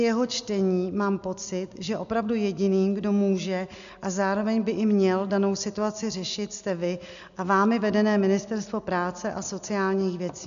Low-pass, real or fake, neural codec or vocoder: 7.2 kHz; real; none